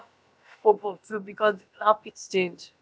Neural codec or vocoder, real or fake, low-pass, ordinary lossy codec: codec, 16 kHz, about 1 kbps, DyCAST, with the encoder's durations; fake; none; none